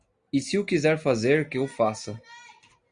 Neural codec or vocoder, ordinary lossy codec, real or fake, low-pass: none; Opus, 64 kbps; real; 9.9 kHz